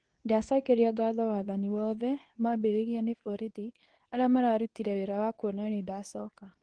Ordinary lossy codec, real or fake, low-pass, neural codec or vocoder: Opus, 16 kbps; fake; 9.9 kHz; codec, 24 kHz, 0.9 kbps, WavTokenizer, medium speech release version 2